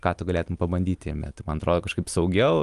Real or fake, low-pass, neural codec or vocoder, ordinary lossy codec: real; 10.8 kHz; none; Opus, 32 kbps